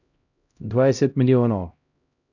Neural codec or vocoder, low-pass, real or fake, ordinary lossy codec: codec, 16 kHz, 0.5 kbps, X-Codec, HuBERT features, trained on LibriSpeech; 7.2 kHz; fake; none